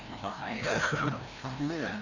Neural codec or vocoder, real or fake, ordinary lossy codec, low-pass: codec, 16 kHz, 1 kbps, FreqCodec, larger model; fake; none; 7.2 kHz